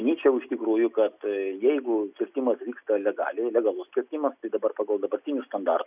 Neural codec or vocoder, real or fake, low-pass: none; real; 3.6 kHz